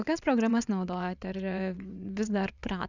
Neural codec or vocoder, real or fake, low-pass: vocoder, 22.05 kHz, 80 mel bands, WaveNeXt; fake; 7.2 kHz